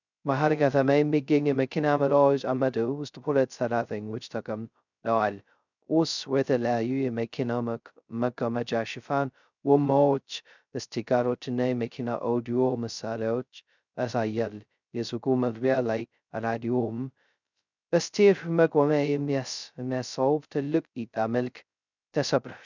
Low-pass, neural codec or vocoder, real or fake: 7.2 kHz; codec, 16 kHz, 0.2 kbps, FocalCodec; fake